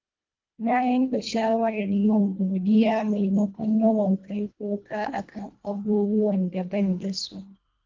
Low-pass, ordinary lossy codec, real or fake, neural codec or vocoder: 7.2 kHz; Opus, 24 kbps; fake; codec, 24 kHz, 1.5 kbps, HILCodec